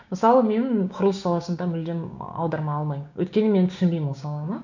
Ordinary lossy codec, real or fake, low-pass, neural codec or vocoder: none; fake; 7.2 kHz; codec, 16 kHz, 6 kbps, DAC